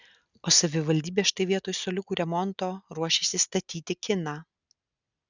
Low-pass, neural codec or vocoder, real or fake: 7.2 kHz; none; real